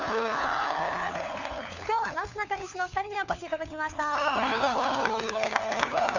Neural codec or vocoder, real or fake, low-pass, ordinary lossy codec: codec, 16 kHz, 4 kbps, FunCodec, trained on LibriTTS, 50 frames a second; fake; 7.2 kHz; none